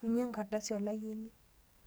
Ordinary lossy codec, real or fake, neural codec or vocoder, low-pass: none; fake; codec, 44.1 kHz, 2.6 kbps, SNAC; none